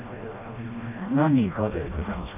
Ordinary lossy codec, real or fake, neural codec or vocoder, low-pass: none; fake; codec, 16 kHz, 1 kbps, FreqCodec, smaller model; 3.6 kHz